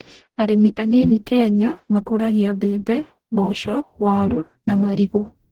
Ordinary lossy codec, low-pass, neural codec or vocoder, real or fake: Opus, 16 kbps; 19.8 kHz; codec, 44.1 kHz, 0.9 kbps, DAC; fake